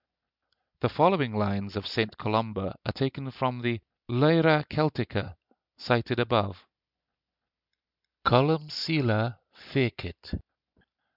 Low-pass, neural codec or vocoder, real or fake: 5.4 kHz; none; real